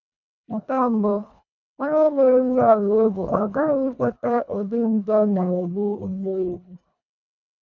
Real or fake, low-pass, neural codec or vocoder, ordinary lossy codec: fake; 7.2 kHz; codec, 24 kHz, 1.5 kbps, HILCodec; none